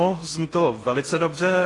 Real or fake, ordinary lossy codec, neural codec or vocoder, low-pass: fake; AAC, 32 kbps; codec, 16 kHz in and 24 kHz out, 0.6 kbps, FocalCodec, streaming, 4096 codes; 10.8 kHz